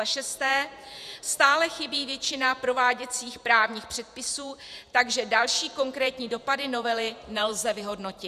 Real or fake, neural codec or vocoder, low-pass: fake; vocoder, 48 kHz, 128 mel bands, Vocos; 14.4 kHz